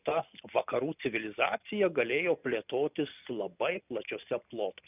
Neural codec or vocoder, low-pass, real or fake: none; 3.6 kHz; real